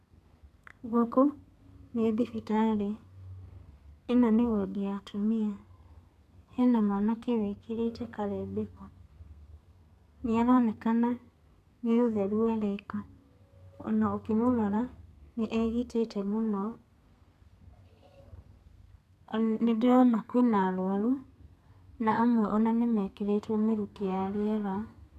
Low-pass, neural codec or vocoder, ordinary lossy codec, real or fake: 14.4 kHz; codec, 32 kHz, 1.9 kbps, SNAC; none; fake